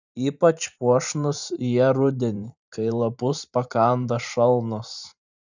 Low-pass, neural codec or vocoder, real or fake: 7.2 kHz; vocoder, 44.1 kHz, 128 mel bands every 512 samples, BigVGAN v2; fake